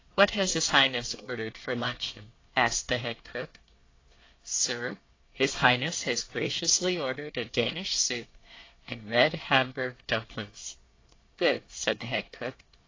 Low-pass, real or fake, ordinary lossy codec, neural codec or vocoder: 7.2 kHz; fake; AAC, 32 kbps; codec, 24 kHz, 1 kbps, SNAC